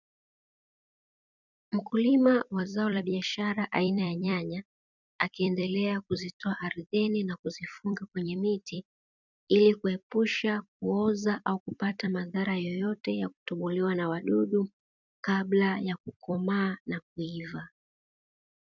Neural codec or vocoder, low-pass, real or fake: vocoder, 44.1 kHz, 128 mel bands every 256 samples, BigVGAN v2; 7.2 kHz; fake